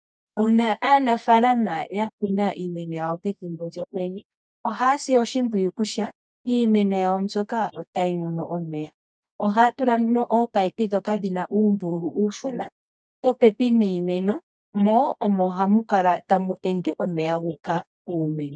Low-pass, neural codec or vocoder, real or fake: 9.9 kHz; codec, 24 kHz, 0.9 kbps, WavTokenizer, medium music audio release; fake